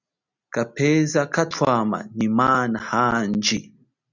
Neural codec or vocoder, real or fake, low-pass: none; real; 7.2 kHz